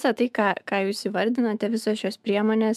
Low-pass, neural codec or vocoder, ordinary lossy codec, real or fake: 14.4 kHz; autoencoder, 48 kHz, 128 numbers a frame, DAC-VAE, trained on Japanese speech; MP3, 96 kbps; fake